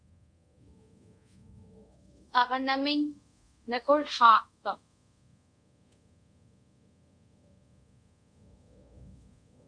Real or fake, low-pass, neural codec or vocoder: fake; 9.9 kHz; codec, 24 kHz, 0.5 kbps, DualCodec